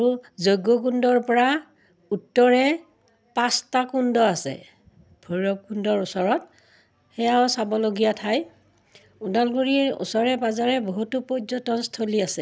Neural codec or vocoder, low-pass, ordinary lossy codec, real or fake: none; none; none; real